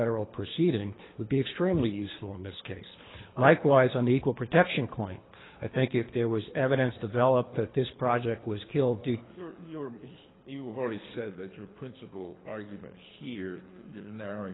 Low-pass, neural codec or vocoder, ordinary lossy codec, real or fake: 7.2 kHz; codec, 24 kHz, 3 kbps, HILCodec; AAC, 16 kbps; fake